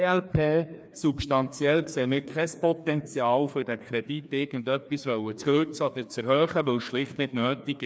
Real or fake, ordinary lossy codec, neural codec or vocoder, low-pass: fake; none; codec, 16 kHz, 2 kbps, FreqCodec, larger model; none